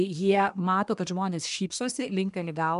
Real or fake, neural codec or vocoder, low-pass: fake; codec, 24 kHz, 1 kbps, SNAC; 10.8 kHz